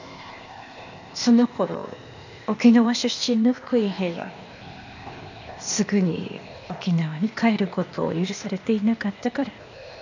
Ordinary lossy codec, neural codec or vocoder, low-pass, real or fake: none; codec, 16 kHz, 0.8 kbps, ZipCodec; 7.2 kHz; fake